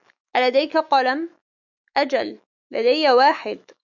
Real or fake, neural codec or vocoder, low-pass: fake; autoencoder, 48 kHz, 128 numbers a frame, DAC-VAE, trained on Japanese speech; 7.2 kHz